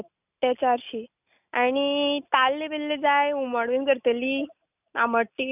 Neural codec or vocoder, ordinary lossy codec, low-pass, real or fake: none; none; 3.6 kHz; real